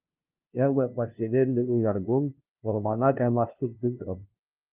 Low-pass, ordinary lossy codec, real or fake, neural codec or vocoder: 3.6 kHz; Opus, 24 kbps; fake; codec, 16 kHz, 0.5 kbps, FunCodec, trained on LibriTTS, 25 frames a second